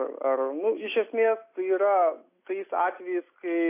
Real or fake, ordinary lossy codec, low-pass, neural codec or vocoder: real; AAC, 24 kbps; 3.6 kHz; none